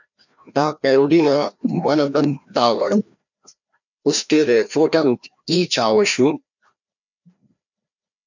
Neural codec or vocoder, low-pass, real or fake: codec, 16 kHz, 1 kbps, FreqCodec, larger model; 7.2 kHz; fake